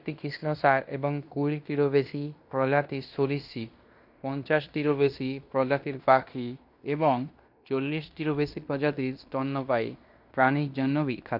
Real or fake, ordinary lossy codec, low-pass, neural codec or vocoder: fake; none; 5.4 kHz; codec, 16 kHz in and 24 kHz out, 0.9 kbps, LongCat-Audio-Codec, fine tuned four codebook decoder